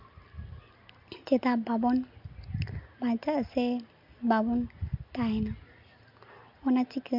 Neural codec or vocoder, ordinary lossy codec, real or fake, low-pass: none; MP3, 48 kbps; real; 5.4 kHz